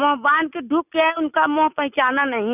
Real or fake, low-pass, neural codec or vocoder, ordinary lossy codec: real; 3.6 kHz; none; none